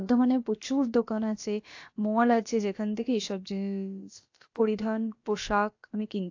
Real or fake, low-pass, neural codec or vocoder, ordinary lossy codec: fake; 7.2 kHz; codec, 16 kHz, about 1 kbps, DyCAST, with the encoder's durations; AAC, 48 kbps